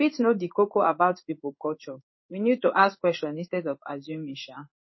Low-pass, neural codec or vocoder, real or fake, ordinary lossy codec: 7.2 kHz; codec, 16 kHz in and 24 kHz out, 1 kbps, XY-Tokenizer; fake; MP3, 24 kbps